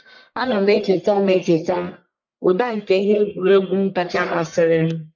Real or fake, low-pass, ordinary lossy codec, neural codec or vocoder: fake; 7.2 kHz; MP3, 64 kbps; codec, 44.1 kHz, 1.7 kbps, Pupu-Codec